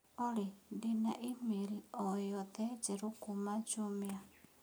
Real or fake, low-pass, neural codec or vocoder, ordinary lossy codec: real; none; none; none